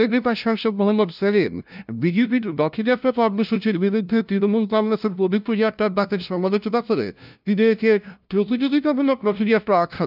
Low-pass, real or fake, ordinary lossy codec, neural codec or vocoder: 5.4 kHz; fake; none; codec, 16 kHz, 0.5 kbps, FunCodec, trained on LibriTTS, 25 frames a second